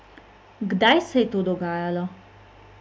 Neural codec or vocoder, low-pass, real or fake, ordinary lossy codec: none; none; real; none